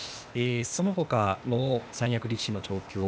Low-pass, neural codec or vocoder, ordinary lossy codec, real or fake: none; codec, 16 kHz, 0.8 kbps, ZipCodec; none; fake